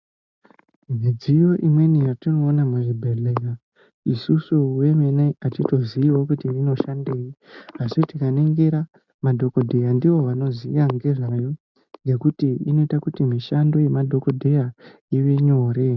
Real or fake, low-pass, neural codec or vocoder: real; 7.2 kHz; none